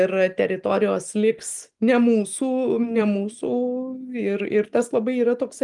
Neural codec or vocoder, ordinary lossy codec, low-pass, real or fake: none; Opus, 32 kbps; 10.8 kHz; real